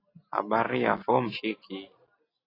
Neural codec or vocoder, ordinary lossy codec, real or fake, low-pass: none; AAC, 24 kbps; real; 5.4 kHz